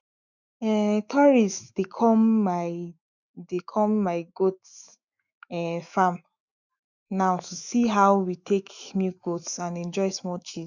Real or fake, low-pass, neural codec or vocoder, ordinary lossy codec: fake; 7.2 kHz; autoencoder, 48 kHz, 128 numbers a frame, DAC-VAE, trained on Japanese speech; none